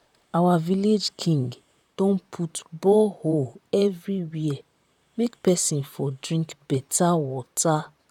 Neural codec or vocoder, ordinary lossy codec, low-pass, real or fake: vocoder, 44.1 kHz, 128 mel bands, Pupu-Vocoder; none; 19.8 kHz; fake